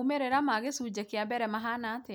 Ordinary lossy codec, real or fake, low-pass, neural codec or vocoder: none; real; none; none